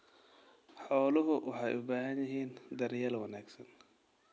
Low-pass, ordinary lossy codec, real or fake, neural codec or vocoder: none; none; real; none